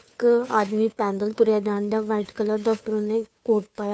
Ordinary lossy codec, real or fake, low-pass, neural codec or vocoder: none; fake; none; codec, 16 kHz, 2 kbps, FunCodec, trained on Chinese and English, 25 frames a second